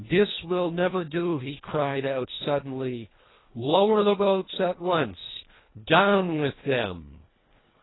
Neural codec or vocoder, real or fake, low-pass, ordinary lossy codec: codec, 24 kHz, 1.5 kbps, HILCodec; fake; 7.2 kHz; AAC, 16 kbps